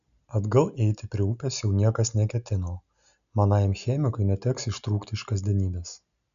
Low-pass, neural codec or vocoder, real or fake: 7.2 kHz; none; real